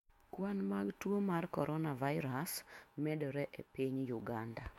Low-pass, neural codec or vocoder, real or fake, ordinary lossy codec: 19.8 kHz; autoencoder, 48 kHz, 128 numbers a frame, DAC-VAE, trained on Japanese speech; fake; MP3, 64 kbps